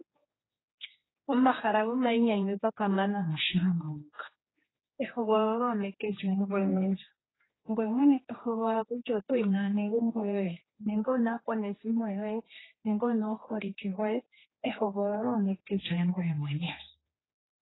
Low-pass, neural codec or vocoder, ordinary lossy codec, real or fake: 7.2 kHz; codec, 16 kHz, 2 kbps, X-Codec, HuBERT features, trained on general audio; AAC, 16 kbps; fake